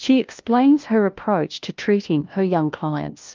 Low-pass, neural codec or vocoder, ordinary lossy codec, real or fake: 7.2 kHz; codec, 16 kHz, 1 kbps, FunCodec, trained on LibriTTS, 50 frames a second; Opus, 32 kbps; fake